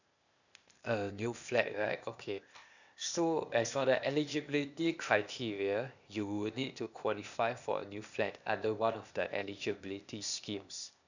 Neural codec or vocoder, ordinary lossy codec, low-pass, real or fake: codec, 16 kHz, 0.8 kbps, ZipCodec; none; 7.2 kHz; fake